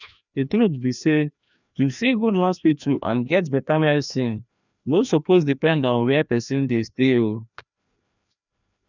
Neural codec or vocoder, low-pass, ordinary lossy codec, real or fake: codec, 16 kHz, 1 kbps, FreqCodec, larger model; 7.2 kHz; none; fake